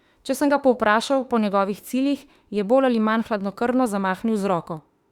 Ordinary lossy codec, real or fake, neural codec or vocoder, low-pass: Opus, 64 kbps; fake; autoencoder, 48 kHz, 32 numbers a frame, DAC-VAE, trained on Japanese speech; 19.8 kHz